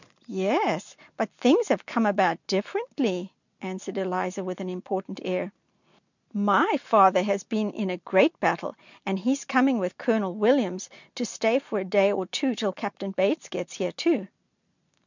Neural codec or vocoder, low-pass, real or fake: none; 7.2 kHz; real